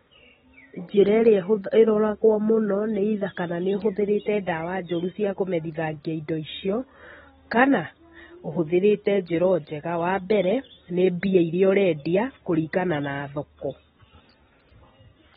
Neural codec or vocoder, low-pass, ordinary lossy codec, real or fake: none; 19.8 kHz; AAC, 16 kbps; real